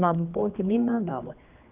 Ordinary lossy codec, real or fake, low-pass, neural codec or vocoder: none; fake; 3.6 kHz; codec, 16 kHz, 2 kbps, X-Codec, HuBERT features, trained on general audio